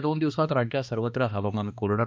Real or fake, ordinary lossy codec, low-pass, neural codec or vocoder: fake; none; none; codec, 16 kHz, 2 kbps, X-Codec, HuBERT features, trained on balanced general audio